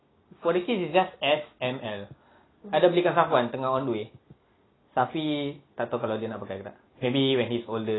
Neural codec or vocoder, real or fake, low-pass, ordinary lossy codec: none; real; 7.2 kHz; AAC, 16 kbps